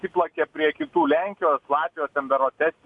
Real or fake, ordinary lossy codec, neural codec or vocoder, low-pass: real; AAC, 64 kbps; none; 10.8 kHz